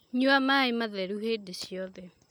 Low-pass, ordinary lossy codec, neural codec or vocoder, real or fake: none; none; none; real